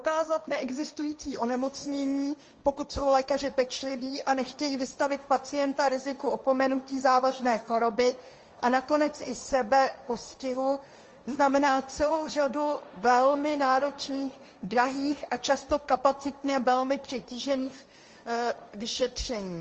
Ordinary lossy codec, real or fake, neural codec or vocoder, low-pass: Opus, 24 kbps; fake; codec, 16 kHz, 1.1 kbps, Voila-Tokenizer; 7.2 kHz